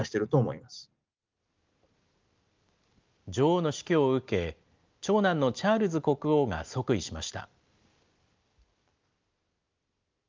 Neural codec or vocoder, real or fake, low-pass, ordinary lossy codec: none; real; 7.2 kHz; Opus, 24 kbps